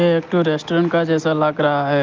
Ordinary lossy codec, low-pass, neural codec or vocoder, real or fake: Opus, 24 kbps; 7.2 kHz; none; real